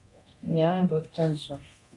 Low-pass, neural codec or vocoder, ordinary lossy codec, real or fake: 10.8 kHz; codec, 24 kHz, 0.9 kbps, DualCodec; MP3, 64 kbps; fake